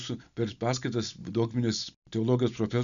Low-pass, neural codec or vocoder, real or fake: 7.2 kHz; none; real